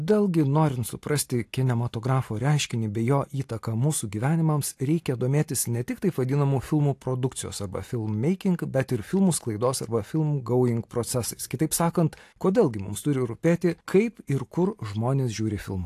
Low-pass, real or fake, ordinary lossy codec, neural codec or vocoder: 14.4 kHz; real; AAC, 64 kbps; none